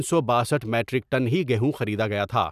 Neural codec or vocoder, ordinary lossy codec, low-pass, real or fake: none; none; 14.4 kHz; real